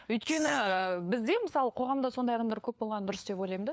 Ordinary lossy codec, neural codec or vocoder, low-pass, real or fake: none; codec, 16 kHz, 4 kbps, FunCodec, trained on LibriTTS, 50 frames a second; none; fake